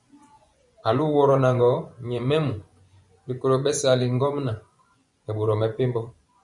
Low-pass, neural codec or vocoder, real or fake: 10.8 kHz; vocoder, 24 kHz, 100 mel bands, Vocos; fake